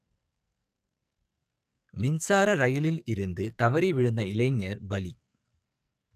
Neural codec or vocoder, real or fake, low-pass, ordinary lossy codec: codec, 44.1 kHz, 2.6 kbps, SNAC; fake; 14.4 kHz; none